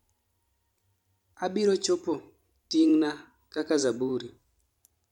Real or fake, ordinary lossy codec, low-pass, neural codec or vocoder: fake; none; 19.8 kHz; vocoder, 44.1 kHz, 128 mel bands every 512 samples, BigVGAN v2